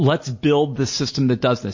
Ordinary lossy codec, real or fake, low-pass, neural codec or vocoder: MP3, 32 kbps; real; 7.2 kHz; none